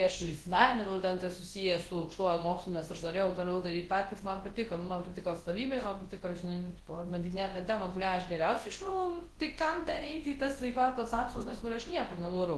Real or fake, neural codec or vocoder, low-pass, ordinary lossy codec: fake; codec, 24 kHz, 0.9 kbps, WavTokenizer, large speech release; 10.8 kHz; Opus, 16 kbps